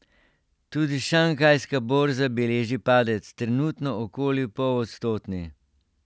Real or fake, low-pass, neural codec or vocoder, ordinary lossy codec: real; none; none; none